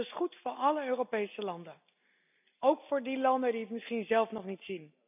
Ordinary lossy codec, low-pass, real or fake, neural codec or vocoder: none; 3.6 kHz; real; none